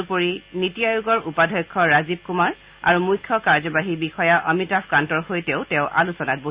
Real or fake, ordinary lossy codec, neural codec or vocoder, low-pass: real; Opus, 64 kbps; none; 3.6 kHz